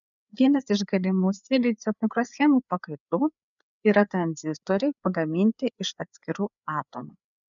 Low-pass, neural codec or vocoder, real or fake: 7.2 kHz; codec, 16 kHz, 4 kbps, FreqCodec, larger model; fake